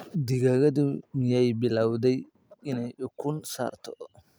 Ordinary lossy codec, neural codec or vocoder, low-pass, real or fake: none; none; none; real